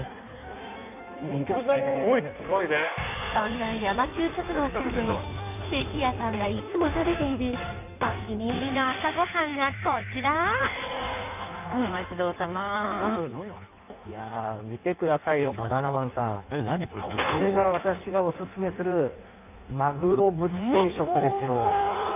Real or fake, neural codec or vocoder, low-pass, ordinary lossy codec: fake; codec, 16 kHz in and 24 kHz out, 1.1 kbps, FireRedTTS-2 codec; 3.6 kHz; none